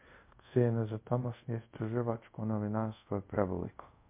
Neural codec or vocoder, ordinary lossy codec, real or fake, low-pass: codec, 24 kHz, 0.5 kbps, DualCodec; MP3, 32 kbps; fake; 3.6 kHz